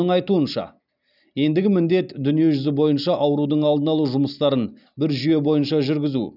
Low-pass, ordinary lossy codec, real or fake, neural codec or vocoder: 5.4 kHz; none; real; none